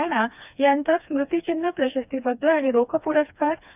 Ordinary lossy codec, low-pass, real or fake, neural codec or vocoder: none; 3.6 kHz; fake; codec, 16 kHz, 2 kbps, FreqCodec, smaller model